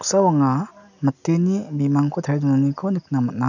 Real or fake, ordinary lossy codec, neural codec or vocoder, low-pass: real; none; none; 7.2 kHz